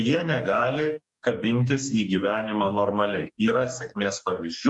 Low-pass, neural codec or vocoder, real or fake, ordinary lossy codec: 10.8 kHz; codec, 44.1 kHz, 2.6 kbps, DAC; fake; MP3, 96 kbps